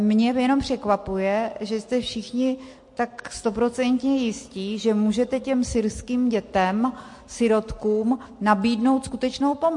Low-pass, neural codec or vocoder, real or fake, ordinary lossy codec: 10.8 kHz; none; real; MP3, 48 kbps